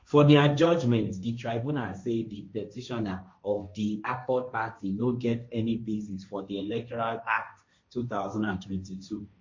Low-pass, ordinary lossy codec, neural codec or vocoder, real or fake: 7.2 kHz; MP3, 48 kbps; codec, 16 kHz, 1.1 kbps, Voila-Tokenizer; fake